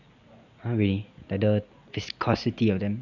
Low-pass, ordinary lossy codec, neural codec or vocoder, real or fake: 7.2 kHz; none; none; real